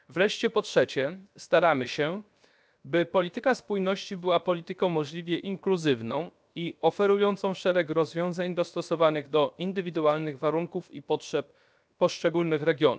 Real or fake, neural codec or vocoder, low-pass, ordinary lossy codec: fake; codec, 16 kHz, 0.7 kbps, FocalCodec; none; none